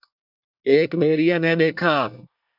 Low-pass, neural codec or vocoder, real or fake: 5.4 kHz; codec, 24 kHz, 1 kbps, SNAC; fake